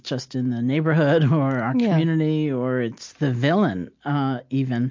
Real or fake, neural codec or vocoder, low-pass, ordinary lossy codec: real; none; 7.2 kHz; MP3, 48 kbps